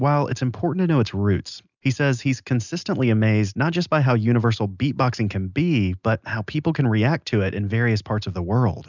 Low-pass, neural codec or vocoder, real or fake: 7.2 kHz; none; real